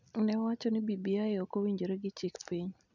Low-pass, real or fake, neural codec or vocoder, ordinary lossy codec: 7.2 kHz; real; none; none